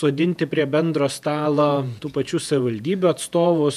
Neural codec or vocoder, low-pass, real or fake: vocoder, 48 kHz, 128 mel bands, Vocos; 14.4 kHz; fake